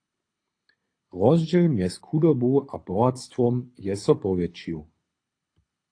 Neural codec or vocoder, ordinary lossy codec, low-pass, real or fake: codec, 24 kHz, 6 kbps, HILCodec; AAC, 48 kbps; 9.9 kHz; fake